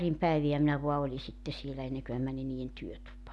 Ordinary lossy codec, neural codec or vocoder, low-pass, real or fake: none; none; none; real